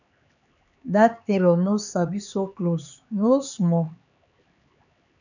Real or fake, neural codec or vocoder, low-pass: fake; codec, 16 kHz, 4 kbps, X-Codec, HuBERT features, trained on LibriSpeech; 7.2 kHz